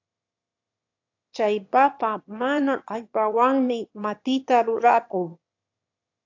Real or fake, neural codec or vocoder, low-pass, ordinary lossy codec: fake; autoencoder, 22.05 kHz, a latent of 192 numbers a frame, VITS, trained on one speaker; 7.2 kHz; AAC, 48 kbps